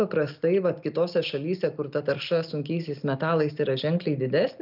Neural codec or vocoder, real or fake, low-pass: none; real; 5.4 kHz